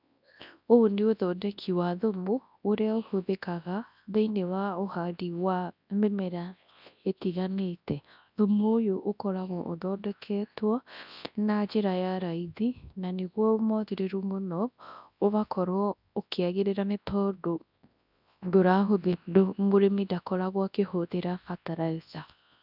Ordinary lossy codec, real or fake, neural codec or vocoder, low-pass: none; fake; codec, 24 kHz, 0.9 kbps, WavTokenizer, large speech release; 5.4 kHz